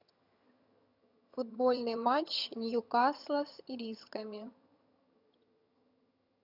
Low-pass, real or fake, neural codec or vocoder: 5.4 kHz; fake; vocoder, 22.05 kHz, 80 mel bands, HiFi-GAN